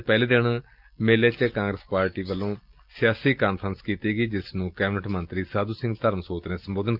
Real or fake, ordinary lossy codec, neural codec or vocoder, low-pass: real; Opus, 24 kbps; none; 5.4 kHz